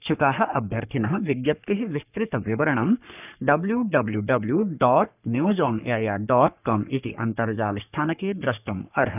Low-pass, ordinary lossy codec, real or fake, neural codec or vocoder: 3.6 kHz; none; fake; codec, 44.1 kHz, 3.4 kbps, Pupu-Codec